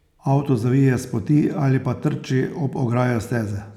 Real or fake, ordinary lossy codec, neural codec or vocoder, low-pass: real; none; none; 19.8 kHz